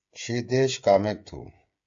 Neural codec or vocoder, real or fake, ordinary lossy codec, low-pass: codec, 16 kHz, 8 kbps, FreqCodec, smaller model; fake; AAC, 64 kbps; 7.2 kHz